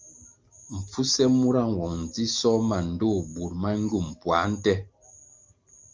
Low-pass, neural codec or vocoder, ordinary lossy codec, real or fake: 7.2 kHz; none; Opus, 32 kbps; real